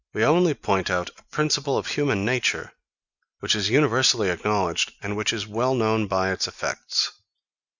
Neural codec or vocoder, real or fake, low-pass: none; real; 7.2 kHz